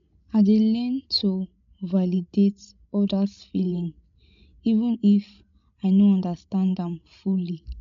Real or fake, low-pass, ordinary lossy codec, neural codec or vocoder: fake; 7.2 kHz; MP3, 64 kbps; codec, 16 kHz, 16 kbps, FreqCodec, larger model